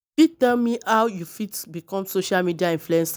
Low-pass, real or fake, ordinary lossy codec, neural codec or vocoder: none; real; none; none